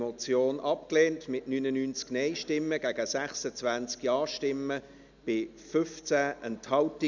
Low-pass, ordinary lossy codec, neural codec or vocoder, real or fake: 7.2 kHz; none; none; real